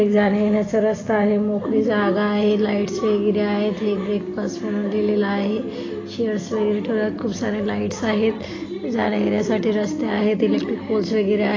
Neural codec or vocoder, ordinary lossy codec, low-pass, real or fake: none; AAC, 32 kbps; 7.2 kHz; real